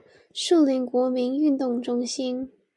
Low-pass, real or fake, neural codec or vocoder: 10.8 kHz; real; none